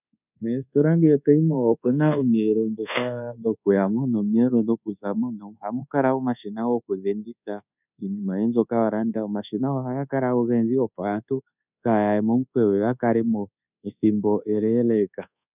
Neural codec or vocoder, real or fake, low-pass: codec, 24 kHz, 1.2 kbps, DualCodec; fake; 3.6 kHz